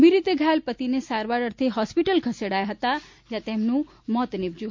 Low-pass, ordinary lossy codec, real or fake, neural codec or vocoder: 7.2 kHz; MP3, 48 kbps; real; none